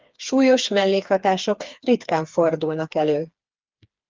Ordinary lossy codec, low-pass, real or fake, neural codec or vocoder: Opus, 32 kbps; 7.2 kHz; fake; codec, 16 kHz, 4 kbps, FreqCodec, smaller model